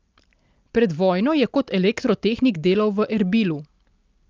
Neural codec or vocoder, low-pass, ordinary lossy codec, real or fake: none; 7.2 kHz; Opus, 24 kbps; real